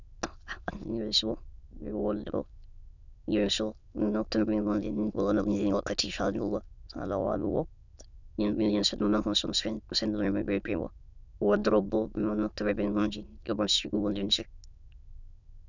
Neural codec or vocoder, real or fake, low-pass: autoencoder, 22.05 kHz, a latent of 192 numbers a frame, VITS, trained on many speakers; fake; 7.2 kHz